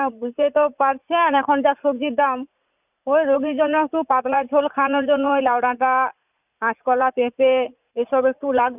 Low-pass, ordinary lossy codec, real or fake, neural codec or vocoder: 3.6 kHz; none; fake; vocoder, 44.1 kHz, 80 mel bands, Vocos